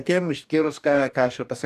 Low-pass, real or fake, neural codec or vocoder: 14.4 kHz; fake; codec, 44.1 kHz, 2.6 kbps, DAC